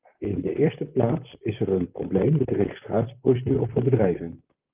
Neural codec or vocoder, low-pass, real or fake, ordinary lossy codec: vocoder, 44.1 kHz, 128 mel bands, Pupu-Vocoder; 3.6 kHz; fake; Opus, 24 kbps